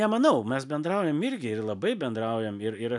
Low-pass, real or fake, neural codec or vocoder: 10.8 kHz; real; none